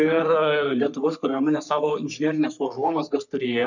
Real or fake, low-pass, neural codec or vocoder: fake; 7.2 kHz; codec, 44.1 kHz, 3.4 kbps, Pupu-Codec